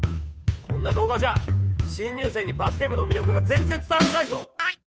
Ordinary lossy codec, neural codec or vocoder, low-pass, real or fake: none; codec, 16 kHz, 2 kbps, FunCodec, trained on Chinese and English, 25 frames a second; none; fake